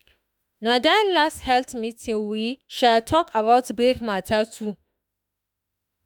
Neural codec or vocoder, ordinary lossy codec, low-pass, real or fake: autoencoder, 48 kHz, 32 numbers a frame, DAC-VAE, trained on Japanese speech; none; none; fake